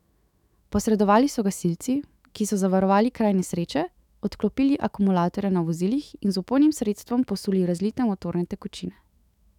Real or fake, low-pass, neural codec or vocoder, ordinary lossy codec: fake; 19.8 kHz; autoencoder, 48 kHz, 128 numbers a frame, DAC-VAE, trained on Japanese speech; none